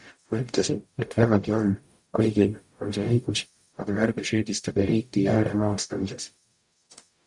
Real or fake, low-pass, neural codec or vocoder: fake; 10.8 kHz; codec, 44.1 kHz, 0.9 kbps, DAC